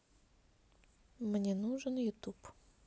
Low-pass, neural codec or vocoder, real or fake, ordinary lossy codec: none; none; real; none